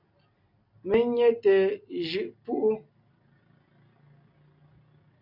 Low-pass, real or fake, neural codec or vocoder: 5.4 kHz; real; none